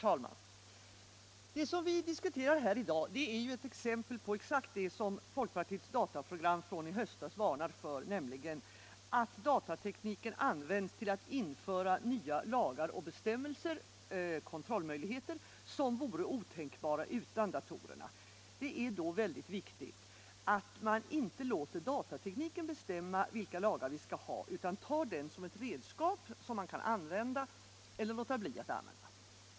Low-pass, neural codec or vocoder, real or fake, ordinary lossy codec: none; none; real; none